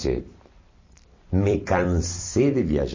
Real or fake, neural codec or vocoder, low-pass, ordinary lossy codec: fake; vocoder, 44.1 kHz, 128 mel bands every 256 samples, BigVGAN v2; 7.2 kHz; MP3, 32 kbps